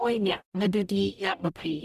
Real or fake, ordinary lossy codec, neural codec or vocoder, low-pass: fake; none; codec, 44.1 kHz, 0.9 kbps, DAC; 14.4 kHz